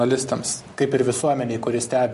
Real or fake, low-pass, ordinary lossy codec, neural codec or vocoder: real; 14.4 kHz; MP3, 48 kbps; none